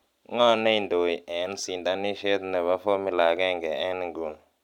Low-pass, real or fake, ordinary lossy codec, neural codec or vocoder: 19.8 kHz; real; none; none